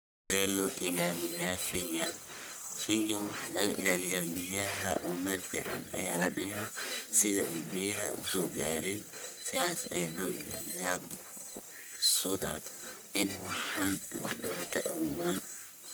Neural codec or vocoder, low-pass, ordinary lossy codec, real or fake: codec, 44.1 kHz, 1.7 kbps, Pupu-Codec; none; none; fake